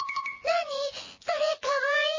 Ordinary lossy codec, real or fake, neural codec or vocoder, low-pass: MP3, 32 kbps; fake; vocoder, 24 kHz, 100 mel bands, Vocos; 7.2 kHz